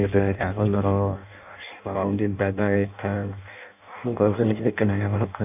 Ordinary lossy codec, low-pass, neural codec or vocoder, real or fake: none; 3.6 kHz; codec, 16 kHz in and 24 kHz out, 0.6 kbps, FireRedTTS-2 codec; fake